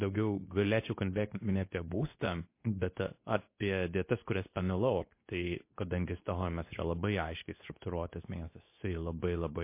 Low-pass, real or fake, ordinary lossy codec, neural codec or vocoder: 3.6 kHz; fake; MP3, 24 kbps; codec, 24 kHz, 0.9 kbps, WavTokenizer, medium speech release version 2